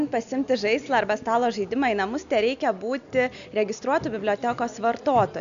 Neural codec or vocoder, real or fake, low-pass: none; real; 7.2 kHz